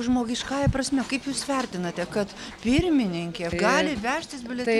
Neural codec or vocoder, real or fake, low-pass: none; real; 19.8 kHz